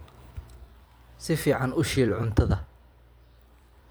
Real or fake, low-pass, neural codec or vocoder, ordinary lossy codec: fake; none; vocoder, 44.1 kHz, 128 mel bands every 256 samples, BigVGAN v2; none